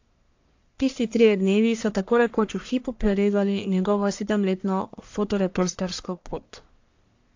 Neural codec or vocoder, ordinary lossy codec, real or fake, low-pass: codec, 44.1 kHz, 1.7 kbps, Pupu-Codec; AAC, 48 kbps; fake; 7.2 kHz